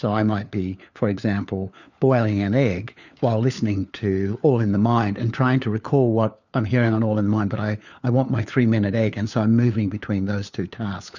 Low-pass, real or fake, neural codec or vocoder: 7.2 kHz; fake; codec, 16 kHz, 4 kbps, FunCodec, trained on LibriTTS, 50 frames a second